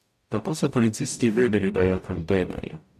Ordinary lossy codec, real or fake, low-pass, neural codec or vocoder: MP3, 64 kbps; fake; 14.4 kHz; codec, 44.1 kHz, 0.9 kbps, DAC